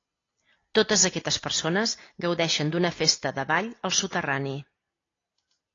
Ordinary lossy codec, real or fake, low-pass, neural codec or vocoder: AAC, 32 kbps; real; 7.2 kHz; none